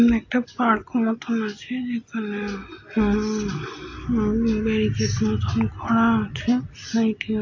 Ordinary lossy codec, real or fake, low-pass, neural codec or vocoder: AAC, 48 kbps; real; 7.2 kHz; none